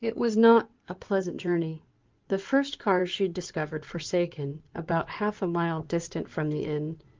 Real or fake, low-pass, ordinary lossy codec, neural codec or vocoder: fake; 7.2 kHz; Opus, 32 kbps; codec, 16 kHz in and 24 kHz out, 2.2 kbps, FireRedTTS-2 codec